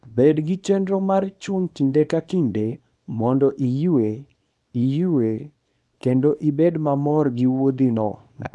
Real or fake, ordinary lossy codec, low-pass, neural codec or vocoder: fake; none; none; codec, 24 kHz, 0.9 kbps, WavTokenizer, small release